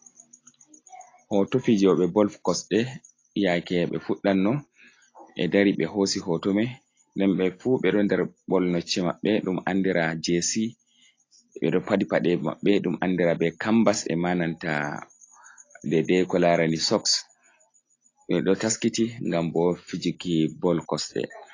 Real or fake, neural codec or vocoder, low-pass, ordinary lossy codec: real; none; 7.2 kHz; AAC, 32 kbps